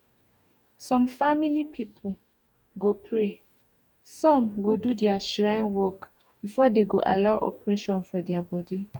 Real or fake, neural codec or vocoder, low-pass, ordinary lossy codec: fake; codec, 44.1 kHz, 2.6 kbps, DAC; 19.8 kHz; none